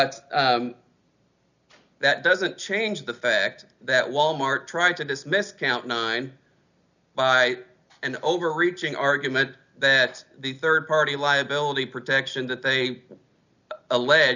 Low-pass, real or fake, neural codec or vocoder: 7.2 kHz; real; none